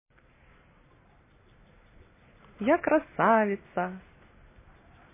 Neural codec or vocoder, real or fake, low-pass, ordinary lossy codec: none; real; 3.6 kHz; MP3, 16 kbps